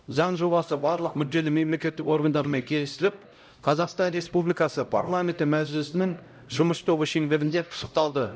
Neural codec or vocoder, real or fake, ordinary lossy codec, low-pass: codec, 16 kHz, 0.5 kbps, X-Codec, HuBERT features, trained on LibriSpeech; fake; none; none